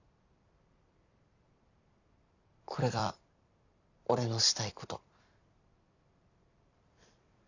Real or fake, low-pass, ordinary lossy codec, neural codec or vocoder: fake; 7.2 kHz; none; vocoder, 44.1 kHz, 128 mel bands every 256 samples, BigVGAN v2